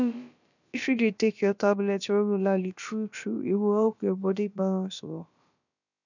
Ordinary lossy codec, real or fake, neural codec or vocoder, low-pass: none; fake; codec, 16 kHz, about 1 kbps, DyCAST, with the encoder's durations; 7.2 kHz